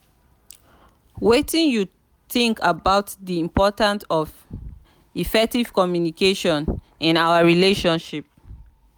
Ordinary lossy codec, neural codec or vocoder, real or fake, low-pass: none; none; real; none